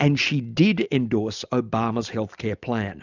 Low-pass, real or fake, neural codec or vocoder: 7.2 kHz; real; none